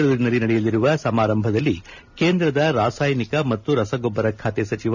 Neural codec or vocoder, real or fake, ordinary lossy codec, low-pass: none; real; none; 7.2 kHz